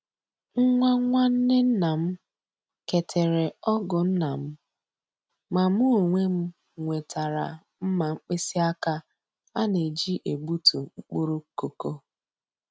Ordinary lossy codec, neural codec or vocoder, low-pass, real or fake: none; none; none; real